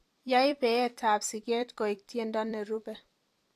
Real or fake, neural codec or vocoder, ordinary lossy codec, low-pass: fake; vocoder, 44.1 kHz, 128 mel bands every 256 samples, BigVGAN v2; AAC, 64 kbps; 14.4 kHz